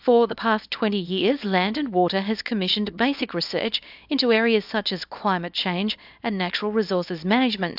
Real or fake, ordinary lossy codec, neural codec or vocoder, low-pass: fake; AAC, 48 kbps; codec, 24 kHz, 0.9 kbps, WavTokenizer, small release; 5.4 kHz